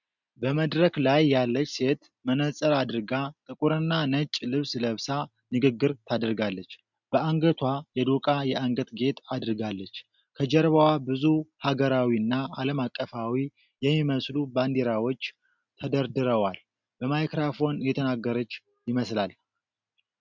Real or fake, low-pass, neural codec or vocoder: real; 7.2 kHz; none